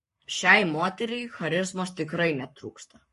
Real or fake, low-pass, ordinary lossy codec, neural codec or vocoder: fake; 14.4 kHz; MP3, 48 kbps; codec, 44.1 kHz, 7.8 kbps, Pupu-Codec